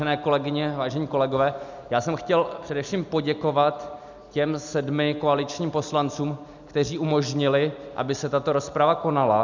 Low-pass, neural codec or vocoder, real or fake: 7.2 kHz; none; real